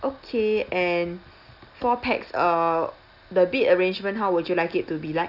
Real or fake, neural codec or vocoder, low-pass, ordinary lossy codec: real; none; 5.4 kHz; none